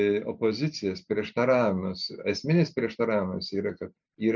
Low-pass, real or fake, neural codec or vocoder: 7.2 kHz; real; none